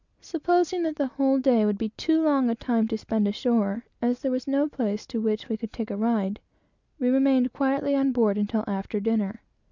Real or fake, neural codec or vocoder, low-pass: real; none; 7.2 kHz